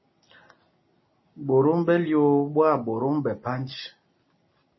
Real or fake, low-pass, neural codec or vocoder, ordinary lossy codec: real; 7.2 kHz; none; MP3, 24 kbps